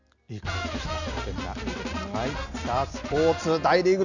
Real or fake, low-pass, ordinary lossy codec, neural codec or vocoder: real; 7.2 kHz; none; none